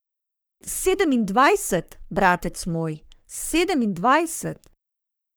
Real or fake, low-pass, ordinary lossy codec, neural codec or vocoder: fake; none; none; codec, 44.1 kHz, 3.4 kbps, Pupu-Codec